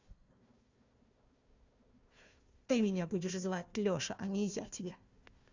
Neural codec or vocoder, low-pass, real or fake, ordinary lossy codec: codec, 16 kHz, 1 kbps, FunCodec, trained on Chinese and English, 50 frames a second; 7.2 kHz; fake; Opus, 64 kbps